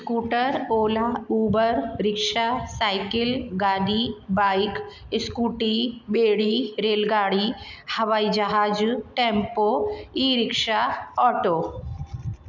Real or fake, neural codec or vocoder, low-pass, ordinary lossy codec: real; none; 7.2 kHz; none